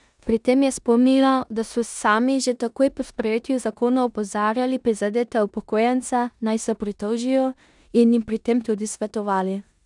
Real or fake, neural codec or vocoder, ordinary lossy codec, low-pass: fake; codec, 16 kHz in and 24 kHz out, 0.9 kbps, LongCat-Audio-Codec, four codebook decoder; none; 10.8 kHz